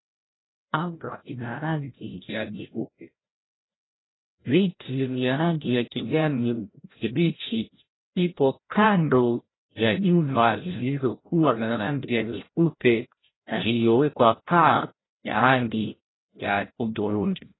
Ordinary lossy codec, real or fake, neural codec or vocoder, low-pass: AAC, 16 kbps; fake; codec, 16 kHz, 0.5 kbps, FreqCodec, larger model; 7.2 kHz